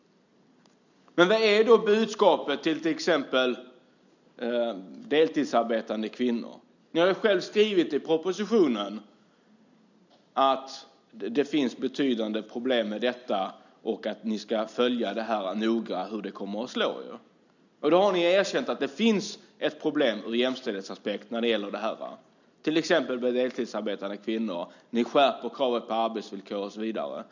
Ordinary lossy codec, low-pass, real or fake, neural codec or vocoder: none; 7.2 kHz; real; none